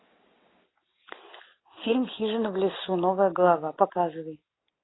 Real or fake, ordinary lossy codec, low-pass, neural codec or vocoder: real; AAC, 16 kbps; 7.2 kHz; none